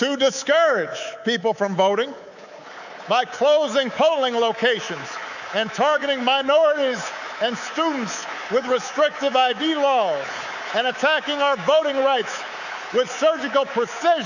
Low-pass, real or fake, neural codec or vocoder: 7.2 kHz; fake; codec, 24 kHz, 3.1 kbps, DualCodec